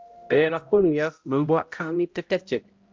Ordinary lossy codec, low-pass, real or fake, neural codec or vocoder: Opus, 32 kbps; 7.2 kHz; fake; codec, 16 kHz, 0.5 kbps, X-Codec, HuBERT features, trained on balanced general audio